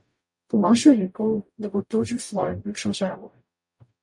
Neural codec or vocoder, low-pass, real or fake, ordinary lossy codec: codec, 44.1 kHz, 0.9 kbps, DAC; 10.8 kHz; fake; MP3, 64 kbps